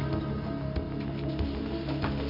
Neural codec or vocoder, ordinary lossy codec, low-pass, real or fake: none; none; 5.4 kHz; real